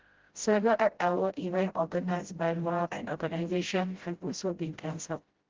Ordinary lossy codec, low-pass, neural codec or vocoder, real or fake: Opus, 16 kbps; 7.2 kHz; codec, 16 kHz, 0.5 kbps, FreqCodec, smaller model; fake